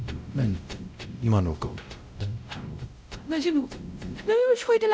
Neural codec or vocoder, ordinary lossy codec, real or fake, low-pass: codec, 16 kHz, 0.5 kbps, X-Codec, WavLM features, trained on Multilingual LibriSpeech; none; fake; none